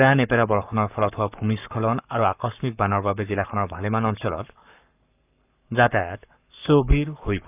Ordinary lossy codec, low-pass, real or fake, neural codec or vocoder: none; 3.6 kHz; fake; codec, 44.1 kHz, 7.8 kbps, Pupu-Codec